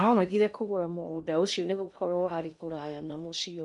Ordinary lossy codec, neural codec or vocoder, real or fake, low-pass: none; codec, 16 kHz in and 24 kHz out, 0.8 kbps, FocalCodec, streaming, 65536 codes; fake; 10.8 kHz